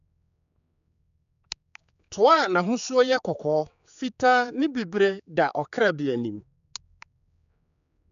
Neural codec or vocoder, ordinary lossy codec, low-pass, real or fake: codec, 16 kHz, 4 kbps, X-Codec, HuBERT features, trained on general audio; none; 7.2 kHz; fake